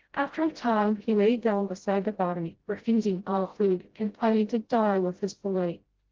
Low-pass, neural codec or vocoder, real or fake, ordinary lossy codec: 7.2 kHz; codec, 16 kHz, 0.5 kbps, FreqCodec, smaller model; fake; Opus, 16 kbps